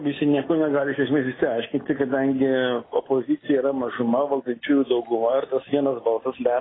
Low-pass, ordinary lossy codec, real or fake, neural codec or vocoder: 7.2 kHz; AAC, 16 kbps; real; none